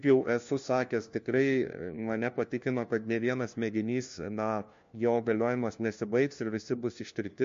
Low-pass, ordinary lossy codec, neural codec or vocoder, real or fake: 7.2 kHz; MP3, 48 kbps; codec, 16 kHz, 1 kbps, FunCodec, trained on LibriTTS, 50 frames a second; fake